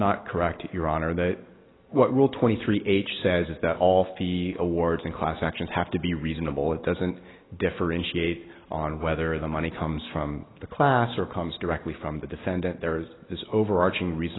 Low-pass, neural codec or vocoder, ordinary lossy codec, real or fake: 7.2 kHz; none; AAC, 16 kbps; real